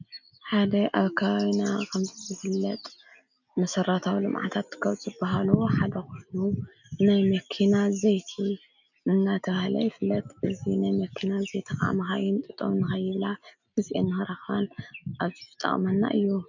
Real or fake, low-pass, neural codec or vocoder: real; 7.2 kHz; none